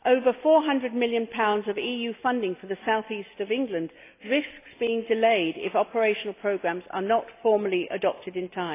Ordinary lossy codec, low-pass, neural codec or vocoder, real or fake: AAC, 24 kbps; 3.6 kHz; none; real